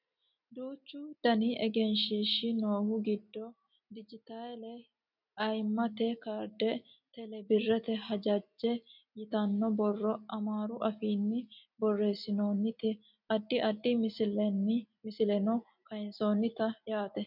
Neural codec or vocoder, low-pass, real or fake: none; 5.4 kHz; real